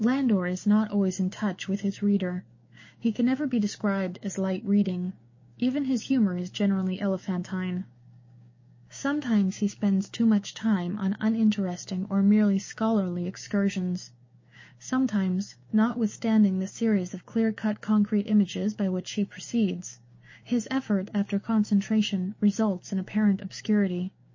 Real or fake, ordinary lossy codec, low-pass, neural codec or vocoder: fake; MP3, 32 kbps; 7.2 kHz; codec, 16 kHz, 6 kbps, DAC